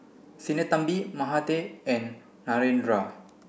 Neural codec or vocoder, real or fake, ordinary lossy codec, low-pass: none; real; none; none